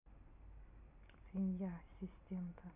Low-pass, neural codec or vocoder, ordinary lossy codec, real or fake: 3.6 kHz; none; none; real